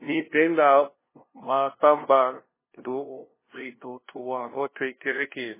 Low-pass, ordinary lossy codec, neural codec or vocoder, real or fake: 3.6 kHz; MP3, 16 kbps; codec, 16 kHz, 0.5 kbps, FunCodec, trained on LibriTTS, 25 frames a second; fake